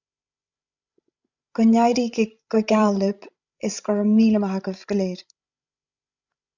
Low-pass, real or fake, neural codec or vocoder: 7.2 kHz; fake; codec, 16 kHz, 16 kbps, FreqCodec, larger model